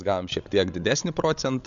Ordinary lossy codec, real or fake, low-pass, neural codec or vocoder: MP3, 64 kbps; fake; 7.2 kHz; codec, 16 kHz, 16 kbps, FreqCodec, larger model